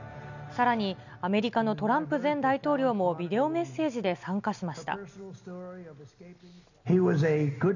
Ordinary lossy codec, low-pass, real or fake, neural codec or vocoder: none; 7.2 kHz; real; none